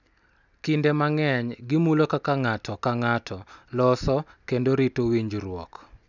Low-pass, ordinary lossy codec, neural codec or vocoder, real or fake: 7.2 kHz; none; none; real